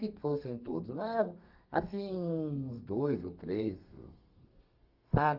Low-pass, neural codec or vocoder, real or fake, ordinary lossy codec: 5.4 kHz; codec, 32 kHz, 1.9 kbps, SNAC; fake; Opus, 24 kbps